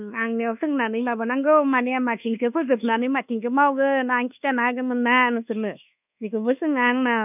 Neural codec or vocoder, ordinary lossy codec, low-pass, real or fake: codec, 24 kHz, 1.2 kbps, DualCodec; none; 3.6 kHz; fake